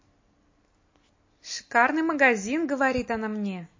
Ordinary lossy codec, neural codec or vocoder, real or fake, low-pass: MP3, 32 kbps; none; real; 7.2 kHz